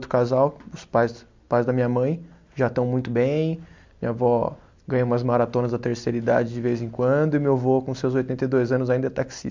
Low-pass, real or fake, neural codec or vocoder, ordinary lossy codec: 7.2 kHz; real; none; MP3, 64 kbps